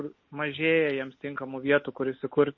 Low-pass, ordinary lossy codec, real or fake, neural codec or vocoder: 7.2 kHz; MP3, 32 kbps; real; none